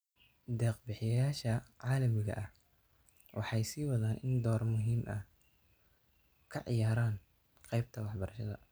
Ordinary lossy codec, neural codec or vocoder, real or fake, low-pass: none; none; real; none